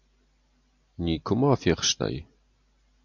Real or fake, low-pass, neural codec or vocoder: real; 7.2 kHz; none